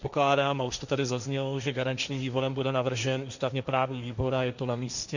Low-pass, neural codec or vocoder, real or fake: 7.2 kHz; codec, 16 kHz, 1.1 kbps, Voila-Tokenizer; fake